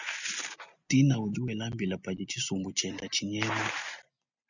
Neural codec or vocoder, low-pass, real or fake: none; 7.2 kHz; real